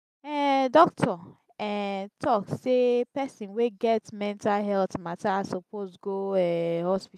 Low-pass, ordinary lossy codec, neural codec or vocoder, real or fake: 14.4 kHz; none; none; real